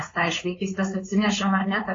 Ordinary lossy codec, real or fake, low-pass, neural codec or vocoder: AAC, 32 kbps; fake; 7.2 kHz; codec, 16 kHz, 4.8 kbps, FACodec